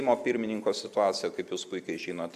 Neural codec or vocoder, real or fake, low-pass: none; real; 14.4 kHz